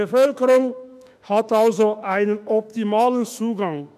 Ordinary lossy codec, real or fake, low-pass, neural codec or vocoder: none; fake; 14.4 kHz; autoencoder, 48 kHz, 32 numbers a frame, DAC-VAE, trained on Japanese speech